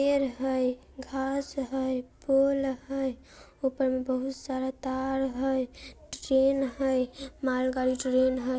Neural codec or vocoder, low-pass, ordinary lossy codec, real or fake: none; none; none; real